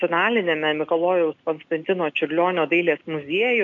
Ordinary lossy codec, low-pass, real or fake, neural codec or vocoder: AAC, 64 kbps; 7.2 kHz; real; none